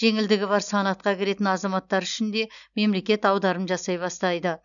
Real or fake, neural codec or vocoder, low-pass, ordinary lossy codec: real; none; 7.2 kHz; none